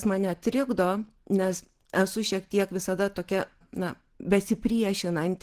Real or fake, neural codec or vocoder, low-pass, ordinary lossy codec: real; none; 14.4 kHz; Opus, 16 kbps